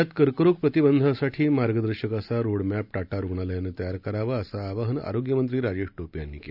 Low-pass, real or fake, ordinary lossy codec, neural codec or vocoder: 5.4 kHz; real; none; none